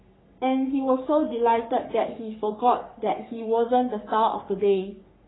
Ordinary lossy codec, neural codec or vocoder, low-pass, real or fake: AAC, 16 kbps; codec, 44.1 kHz, 3.4 kbps, Pupu-Codec; 7.2 kHz; fake